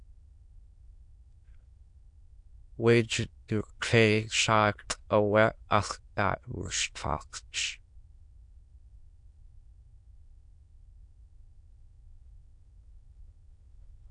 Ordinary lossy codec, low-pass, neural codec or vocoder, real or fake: MP3, 64 kbps; 9.9 kHz; autoencoder, 22.05 kHz, a latent of 192 numbers a frame, VITS, trained on many speakers; fake